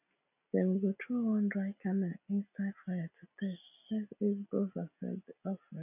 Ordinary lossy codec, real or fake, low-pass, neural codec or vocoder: none; real; 3.6 kHz; none